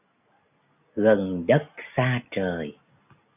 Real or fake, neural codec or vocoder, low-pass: real; none; 3.6 kHz